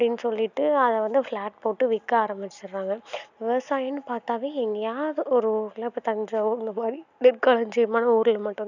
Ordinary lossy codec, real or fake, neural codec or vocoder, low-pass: none; real; none; 7.2 kHz